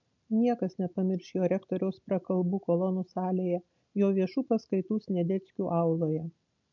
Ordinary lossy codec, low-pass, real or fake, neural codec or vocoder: MP3, 64 kbps; 7.2 kHz; real; none